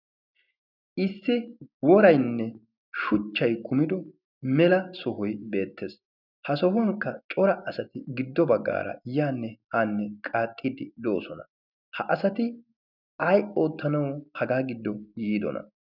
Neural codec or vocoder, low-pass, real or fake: none; 5.4 kHz; real